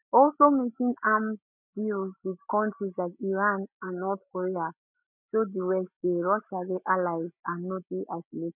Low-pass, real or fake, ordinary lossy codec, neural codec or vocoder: 3.6 kHz; real; none; none